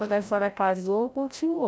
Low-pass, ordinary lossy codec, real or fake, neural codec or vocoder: none; none; fake; codec, 16 kHz, 0.5 kbps, FreqCodec, larger model